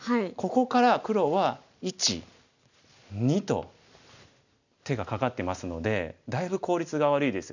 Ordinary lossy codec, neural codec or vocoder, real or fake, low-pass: none; none; real; 7.2 kHz